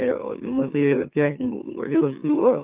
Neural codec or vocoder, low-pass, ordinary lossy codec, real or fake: autoencoder, 44.1 kHz, a latent of 192 numbers a frame, MeloTTS; 3.6 kHz; Opus, 24 kbps; fake